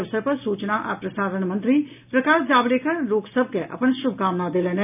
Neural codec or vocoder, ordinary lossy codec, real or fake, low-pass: none; none; real; 3.6 kHz